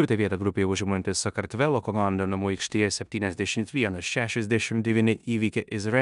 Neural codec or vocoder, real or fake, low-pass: codec, 16 kHz in and 24 kHz out, 0.9 kbps, LongCat-Audio-Codec, four codebook decoder; fake; 10.8 kHz